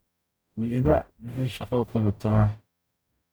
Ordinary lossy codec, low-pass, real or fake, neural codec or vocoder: none; none; fake; codec, 44.1 kHz, 0.9 kbps, DAC